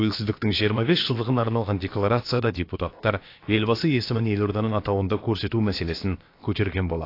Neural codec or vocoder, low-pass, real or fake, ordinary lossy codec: codec, 16 kHz, about 1 kbps, DyCAST, with the encoder's durations; 5.4 kHz; fake; AAC, 32 kbps